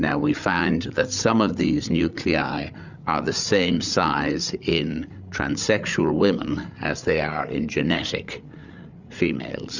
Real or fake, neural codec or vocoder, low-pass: fake; codec, 16 kHz, 8 kbps, FreqCodec, larger model; 7.2 kHz